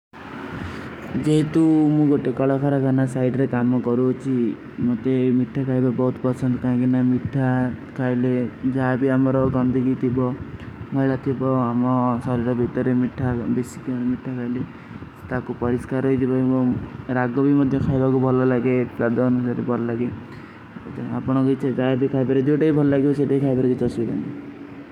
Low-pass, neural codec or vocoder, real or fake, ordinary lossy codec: 19.8 kHz; codec, 44.1 kHz, 7.8 kbps, DAC; fake; none